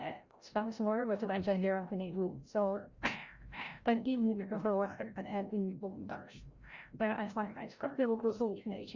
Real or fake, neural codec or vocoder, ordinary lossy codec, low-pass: fake; codec, 16 kHz, 0.5 kbps, FreqCodec, larger model; Opus, 64 kbps; 7.2 kHz